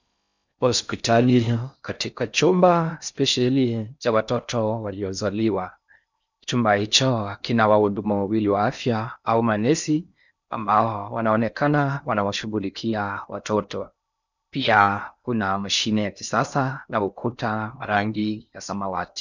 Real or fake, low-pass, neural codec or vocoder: fake; 7.2 kHz; codec, 16 kHz in and 24 kHz out, 0.6 kbps, FocalCodec, streaming, 4096 codes